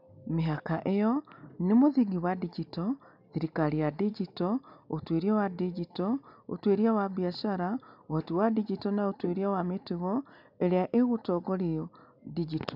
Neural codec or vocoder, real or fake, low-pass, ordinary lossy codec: none; real; 5.4 kHz; none